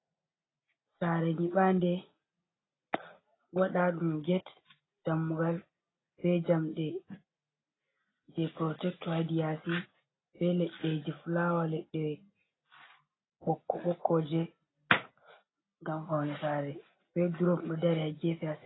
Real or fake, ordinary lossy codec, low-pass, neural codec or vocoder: real; AAC, 16 kbps; 7.2 kHz; none